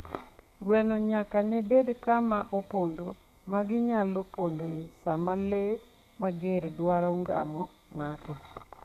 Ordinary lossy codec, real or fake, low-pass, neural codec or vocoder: none; fake; 14.4 kHz; codec, 32 kHz, 1.9 kbps, SNAC